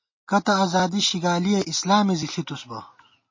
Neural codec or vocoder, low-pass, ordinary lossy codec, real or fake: none; 7.2 kHz; MP3, 32 kbps; real